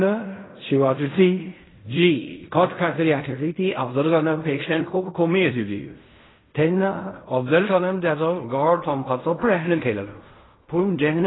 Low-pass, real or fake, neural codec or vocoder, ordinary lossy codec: 7.2 kHz; fake; codec, 16 kHz in and 24 kHz out, 0.4 kbps, LongCat-Audio-Codec, fine tuned four codebook decoder; AAC, 16 kbps